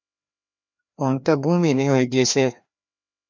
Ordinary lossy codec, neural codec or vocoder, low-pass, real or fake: MP3, 64 kbps; codec, 16 kHz, 1 kbps, FreqCodec, larger model; 7.2 kHz; fake